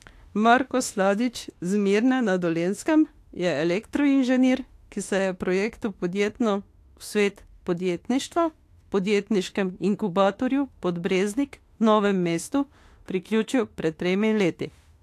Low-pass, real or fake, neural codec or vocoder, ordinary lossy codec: 14.4 kHz; fake; autoencoder, 48 kHz, 32 numbers a frame, DAC-VAE, trained on Japanese speech; AAC, 64 kbps